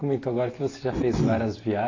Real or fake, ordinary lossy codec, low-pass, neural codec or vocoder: real; AAC, 32 kbps; 7.2 kHz; none